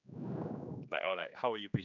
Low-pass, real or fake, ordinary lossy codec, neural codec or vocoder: 7.2 kHz; fake; none; codec, 16 kHz, 2 kbps, X-Codec, HuBERT features, trained on general audio